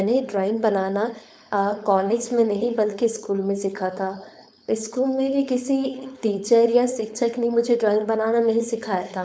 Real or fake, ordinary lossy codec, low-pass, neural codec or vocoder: fake; none; none; codec, 16 kHz, 4.8 kbps, FACodec